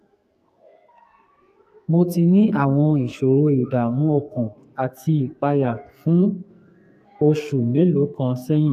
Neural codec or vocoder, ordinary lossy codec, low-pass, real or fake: codec, 44.1 kHz, 2.6 kbps, SNAC; AAC, 96 kbps; 14.4 kHz; fake